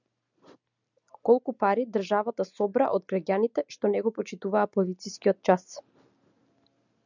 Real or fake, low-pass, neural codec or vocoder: real; 7.2 kHz; none